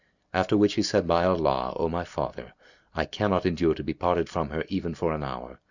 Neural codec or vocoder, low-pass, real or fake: none; 7.2 kHz; real